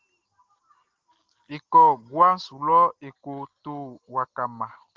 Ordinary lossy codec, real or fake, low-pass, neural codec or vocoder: Opus, 16 kbps; real; 7.2 kHz; none